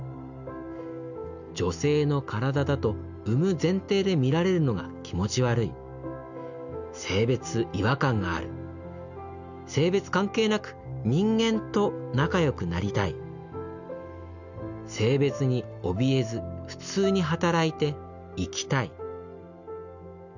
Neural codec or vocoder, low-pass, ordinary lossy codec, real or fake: none; 7.2 kHz; none; real